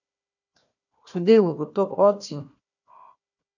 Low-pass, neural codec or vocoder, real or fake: 7.2 kHz; codec, 16 kHz, 1 kbps, FunCodec, trained on Chinese and English, 50 frames a second; fake